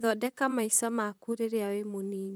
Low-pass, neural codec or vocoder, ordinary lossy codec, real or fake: none; vocoder, 44.1 kHz, 128 mel bands, Pupu-Vocoder; none; fake